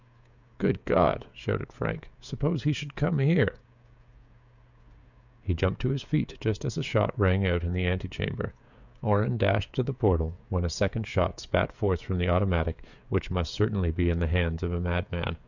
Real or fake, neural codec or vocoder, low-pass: fake; codec, 16 kHz, 16 kbps, FreqCodec, smaller model; 7.2 kHz